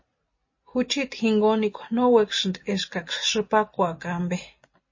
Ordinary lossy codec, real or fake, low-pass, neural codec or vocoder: MP3, 32 kbps; real; 7.2 kHz; none